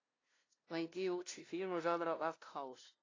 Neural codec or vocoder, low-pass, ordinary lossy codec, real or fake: codec, 16 kHz, 0.5 kbps, FunCodec, trained on LibriTTS, 25 frames a second; 7.2 kHz; AAC, 32 kbps; fake